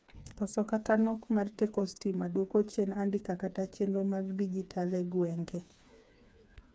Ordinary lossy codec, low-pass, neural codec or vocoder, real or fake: none; none; codec, 16 kHz, 4 kbps, FreqCodec, smaller model; fake